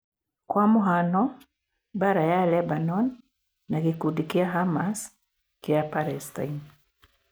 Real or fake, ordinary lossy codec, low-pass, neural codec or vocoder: real; none; none; none